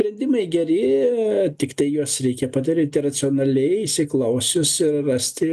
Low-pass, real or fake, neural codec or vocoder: 14.4 kHz; real; none